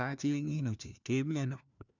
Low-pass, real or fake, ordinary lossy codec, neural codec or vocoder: 7.2 kHz; fake; none; codec, 16 kHz, 1 kbps, FunCodec, trained on LibriTTS, 50 frames a second